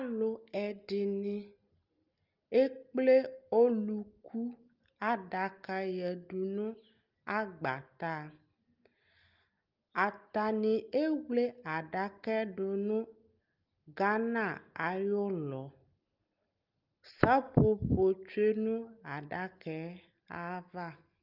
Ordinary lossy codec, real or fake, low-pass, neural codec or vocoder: Opus, 32 kbps; real; 5.4 kHz; none